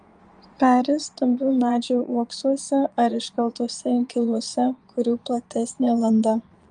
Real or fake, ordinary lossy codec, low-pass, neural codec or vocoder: fake; Opus, 32 kbps; 9.9 kHz; vocoder, 22.05 kHz, 80 mel bands, Vocos